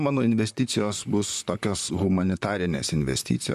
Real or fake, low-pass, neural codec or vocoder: fake; 14.4 kHz; vocoder, 44.1 kHz, 128 mel bands, Pupu-Vocoder